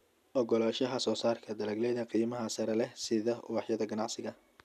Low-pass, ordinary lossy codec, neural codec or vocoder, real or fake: 14.4 kHz; none; none; real